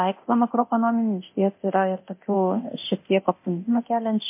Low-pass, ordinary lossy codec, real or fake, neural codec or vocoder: 3.6 kHz; MP3, 24 kbps; fake; codec, 24 kHz, 0.9 kbps, DualCodec